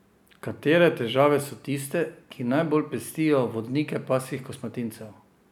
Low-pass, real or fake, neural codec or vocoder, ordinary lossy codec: 19.8 kHz; real; none; none